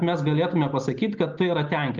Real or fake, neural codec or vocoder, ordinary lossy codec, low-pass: real; none; Opus, 32 kbps; 7.2 kHz